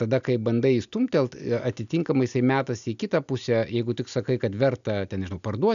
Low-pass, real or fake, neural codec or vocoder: 7.2 kHz; real; none